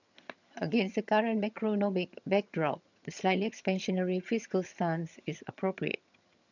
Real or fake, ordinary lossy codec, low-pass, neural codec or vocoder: fake; none; 7.2 kHz; vocoder, 22.05 kHz, 80 mel bands, HiFi-GAN